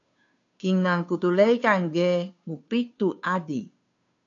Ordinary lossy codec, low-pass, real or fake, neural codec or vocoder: AAC, 64 kbps; 7.2 kHz; fake; codec, 16 kHz, 2 kbps, FunCodec, trained on Chinese and English, 25 frames a second